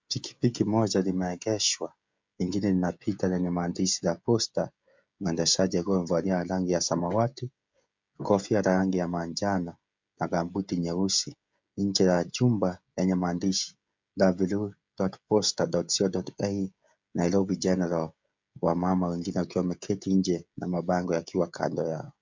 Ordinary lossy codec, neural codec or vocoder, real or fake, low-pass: MP3, 64 kbps; codec, 16 kHz, 16 kbps, FreqCodec, smaller model; fake; 7.2 kHz